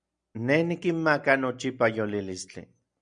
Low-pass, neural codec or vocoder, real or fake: 9.9 kHz; none; real